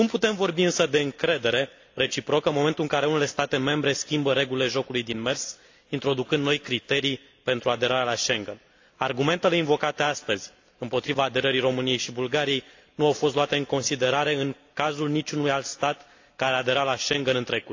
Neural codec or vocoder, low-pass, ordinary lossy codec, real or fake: none; 7.2 kHz; AAC, 48 kbps; real